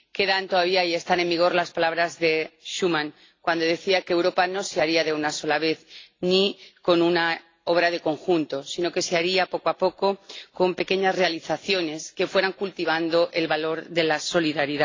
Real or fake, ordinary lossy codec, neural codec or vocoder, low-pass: real; AAC, 32 kbps; none; 7.2 kHz